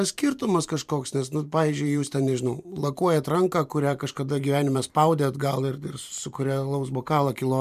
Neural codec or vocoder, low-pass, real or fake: vocoder, 44.1 kHz, 128 mel bands every 512 samples, BigVGAN v2; 14.4 kHz; fake